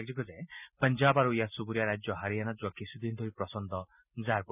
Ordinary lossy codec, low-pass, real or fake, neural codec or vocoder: none; 3.6 kHz; real; none